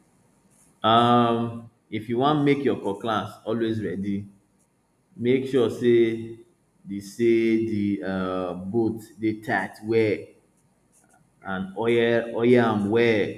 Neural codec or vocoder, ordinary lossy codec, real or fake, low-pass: none; none; real; 14.4 kHz